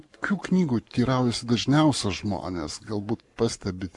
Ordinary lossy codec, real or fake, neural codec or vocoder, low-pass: MP3, 96 kbps; fake; codec, 44.1 kHz, 7.8 kbps, Pupu-Codec; 10.8 kHz